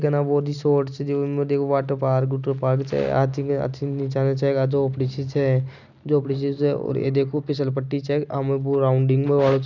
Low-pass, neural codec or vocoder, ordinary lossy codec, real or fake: 7.2 kHz; none; none; real